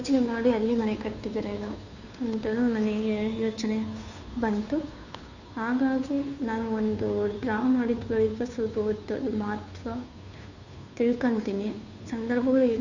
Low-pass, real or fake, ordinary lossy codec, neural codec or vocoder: 7.2 kHz; fake; none; codec, 16 kHz, 2 kbps, FunCodec, trained on Chinese and English, 25 frames a second